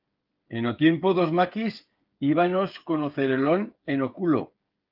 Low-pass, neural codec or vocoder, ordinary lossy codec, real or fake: 5.4 kHz; codec, 16 kHz, 8 kbps, FreqCodec, smaller model; Opus, 32 kbps; fake